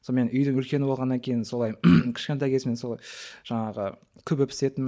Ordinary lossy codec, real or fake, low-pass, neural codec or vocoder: none; real; none; none